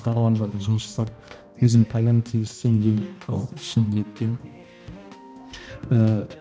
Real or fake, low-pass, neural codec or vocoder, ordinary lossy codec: fake; none; codec, 16 kHz, 1 kbps, X-Codec, HuBERT features, trained on balanced general audio; none